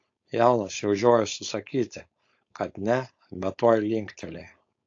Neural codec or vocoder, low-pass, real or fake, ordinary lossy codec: codec, 16 kHz, 4.8 kbps, FACodec; 7.2 kHz; fake; AAC, 48 kbps